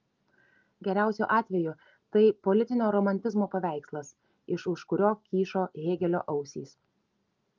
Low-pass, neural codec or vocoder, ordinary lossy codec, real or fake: 7.2 kHz; none; Opus, 24 kbps; real